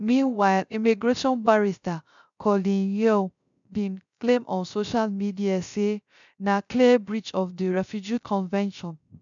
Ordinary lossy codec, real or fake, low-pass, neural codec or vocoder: MP3, 96 kbps; fake; 7.2 kHz; codec, 16 kHz, 0.3 kbps, FocalCodec